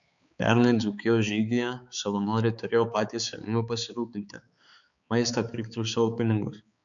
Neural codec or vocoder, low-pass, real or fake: codec, 16 kHz, 4 kbps, X-Codec, HuBERT features, trained on balanced general audio; 7.2 kHz; fake